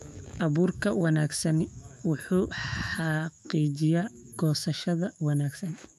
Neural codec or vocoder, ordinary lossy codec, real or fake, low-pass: autoencoder, 48 kHz, 128 numbers a frame, DAC-VAE, trained on Japanese speech; none; fake; 14.4 kHz